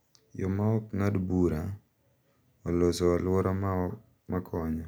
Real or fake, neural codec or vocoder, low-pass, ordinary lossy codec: real; none; none; none